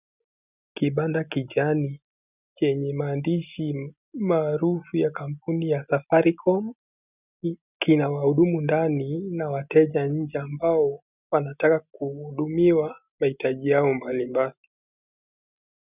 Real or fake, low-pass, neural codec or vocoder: real; 3.6 kHz; none